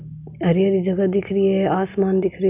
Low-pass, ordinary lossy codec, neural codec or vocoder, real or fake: 3.6 kHz; none; none; real